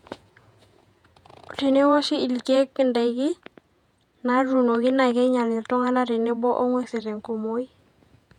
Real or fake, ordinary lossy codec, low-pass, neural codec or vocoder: fake; none; 19.8 kHz; vocoder, 48 kHz, 128 mel bands, Vocos